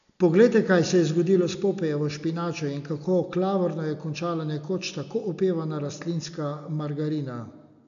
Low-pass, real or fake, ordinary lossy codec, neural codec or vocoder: 7.2 kHz; real; none; none